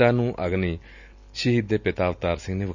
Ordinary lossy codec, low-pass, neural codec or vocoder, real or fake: none; 7.2 kHz; none; real